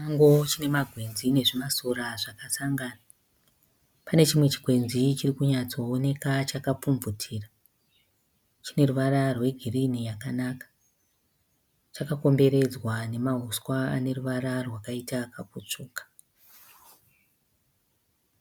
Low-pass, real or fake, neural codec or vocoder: 19.8 kHz; real; none